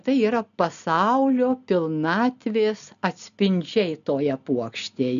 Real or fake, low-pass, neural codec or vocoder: real; 7.2 kHz; none